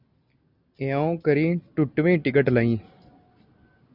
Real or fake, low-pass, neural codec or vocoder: real; 5.4 kHz; none